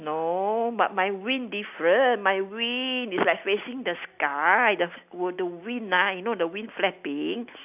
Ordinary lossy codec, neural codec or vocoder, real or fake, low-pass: none; none; real; 3.6 kHz